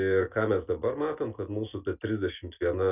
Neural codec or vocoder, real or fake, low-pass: none; real; 3.6 kHz